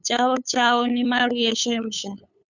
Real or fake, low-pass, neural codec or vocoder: fake; 7.2 kHz; codec, 16 kHz, 8 kbps, FunCodec, trained on LibriTTS, 25 frames a second